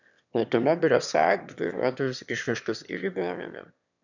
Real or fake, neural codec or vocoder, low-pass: fake; autoencoder, 22.05 kHz, a latent of 192 numbers a frame, VITS, trained on one speaker; 7.2 kHz